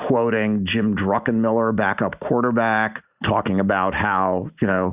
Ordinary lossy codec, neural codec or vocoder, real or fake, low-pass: Opus, 64 kbps; none; real; 3.6 kHz